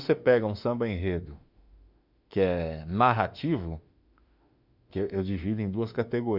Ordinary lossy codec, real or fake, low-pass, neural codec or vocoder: none; fake; 5.4 kHz; autoencoder, 48 kHz, 32 numbers a frame, DAC-VAE, trained on Japanese speech